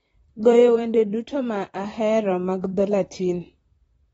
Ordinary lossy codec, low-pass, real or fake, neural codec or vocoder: AAC, 24 kbps; 19.8 kHz; fake; vocoder, 44.1 kHz, 128 mel bands, Pupu-Vocoder